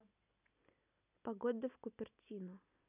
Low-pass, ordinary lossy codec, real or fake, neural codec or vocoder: 3.6 kHz; none; real; none